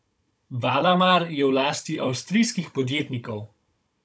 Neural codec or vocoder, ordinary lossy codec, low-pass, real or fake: codec, 16 kHz, 16 kbps, FunCodec, trained on Chinese and English, 50 frames a second; none; none; fake